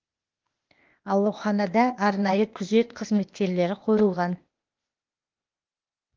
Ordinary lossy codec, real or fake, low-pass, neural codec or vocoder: Opus, 32 kbps; fake; 7.2 kHz; codec, 16 kHz, 0.8 kbps, ZipCodec